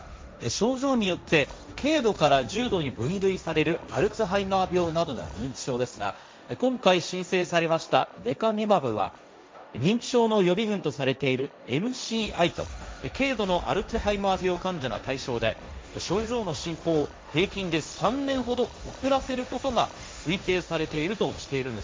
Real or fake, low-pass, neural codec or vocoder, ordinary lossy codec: fake; none; codec, 16 kHz, 1.1 kbps, Voila-Tokenizer; none